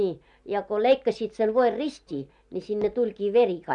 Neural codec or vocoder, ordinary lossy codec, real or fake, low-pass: none; none; real; 10.8 kHz